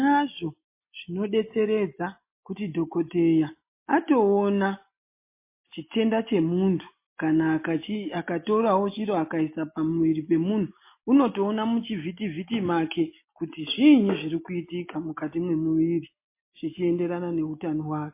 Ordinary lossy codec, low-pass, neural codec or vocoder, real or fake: MP3, 24 kbps; 3.6 kHz; none; real